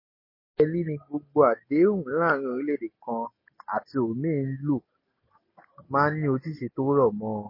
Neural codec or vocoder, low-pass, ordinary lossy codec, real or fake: none; 5.4 kHz; MP3, 24 kbps; real